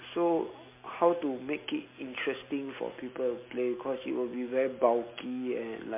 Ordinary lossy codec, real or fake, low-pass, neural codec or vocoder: MP3, 24 kbps; real; 3.6 kHz; none